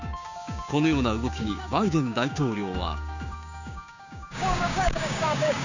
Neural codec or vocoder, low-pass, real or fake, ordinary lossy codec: codec, 16 kHz, 6 kbps, DAC; 7.2 kHz; fake; none